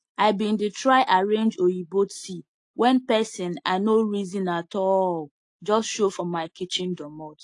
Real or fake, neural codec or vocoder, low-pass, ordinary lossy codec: real; none; 10.8 kHz; AAC, 48 kbps